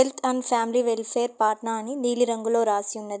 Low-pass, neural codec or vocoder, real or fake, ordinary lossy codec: none; none; real; none